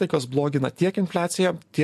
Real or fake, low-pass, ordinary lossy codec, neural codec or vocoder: real; 14.4 kHz; MP3, 64 kbps; none